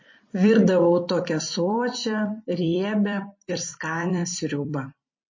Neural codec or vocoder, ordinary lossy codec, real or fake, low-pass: vocoder, 44.1 kHz, 128 mel bands every 256 samples, BigVGAN v2; MP3, 32 kbps; fake; 7.2 kHz